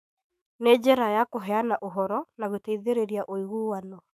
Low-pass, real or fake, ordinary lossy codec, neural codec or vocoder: 14.4 kHz; fake; none; autoencoder, 48 kHz, 128 numbers a frame, DAC-VAE, trained on Japanese speech